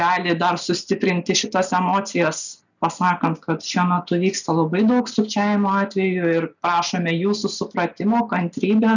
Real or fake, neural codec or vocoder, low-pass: real; none; 7.2 kHz